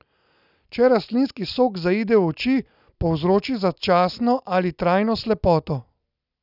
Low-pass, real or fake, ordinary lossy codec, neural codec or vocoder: 5.4 kHz; real; none; none